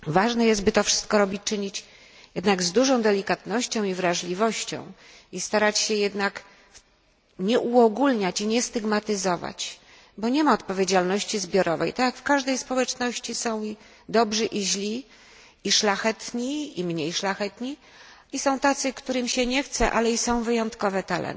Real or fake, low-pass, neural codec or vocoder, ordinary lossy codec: real; none; none; none